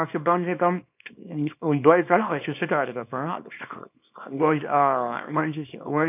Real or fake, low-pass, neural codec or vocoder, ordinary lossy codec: fake; 3.6 kHz; codec, 24 kHz, 0.9 kbps, WavTokenizer, small release; none